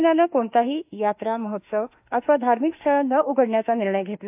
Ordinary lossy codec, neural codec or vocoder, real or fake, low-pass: AAC, 32 kbps; autoencoder, 48 kHz, 32 numbers a frame, DAC-VAE, trained on Japanese speech; fake; 3.6 kHz